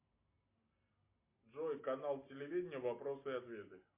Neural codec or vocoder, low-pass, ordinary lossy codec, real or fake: none; 3.6 kHz; MP3, 24 kbps; real